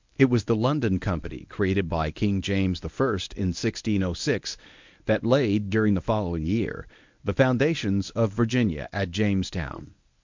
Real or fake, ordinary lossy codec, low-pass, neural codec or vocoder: fake; MP3, 64 kbps; 7.2 kHz; codec, 24 kHz, 0.9 kbps, WavTokenizer, medium speech release version 1